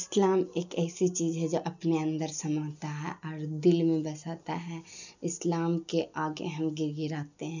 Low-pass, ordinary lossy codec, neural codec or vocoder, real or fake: 7.2 kHz; none; none; real